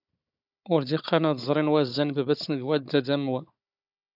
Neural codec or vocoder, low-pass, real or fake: codec, 16 kHz, 16 kbps, FunCodec, trained on Chinese and English, 50 frames a second; 5.4 kHz; fake